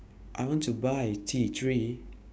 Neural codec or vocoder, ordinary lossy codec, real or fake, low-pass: codec, 16 kHz, 6 kbps, DAC; none; fake; none